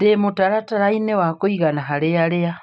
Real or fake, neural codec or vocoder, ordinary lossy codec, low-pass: real; none; none; none